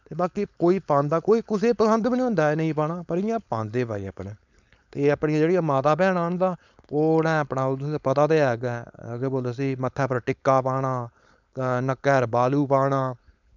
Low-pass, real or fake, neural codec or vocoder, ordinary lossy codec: 7.2 kHz; fake; codec, 16 kHz, 4.8 kbps, FACodec; none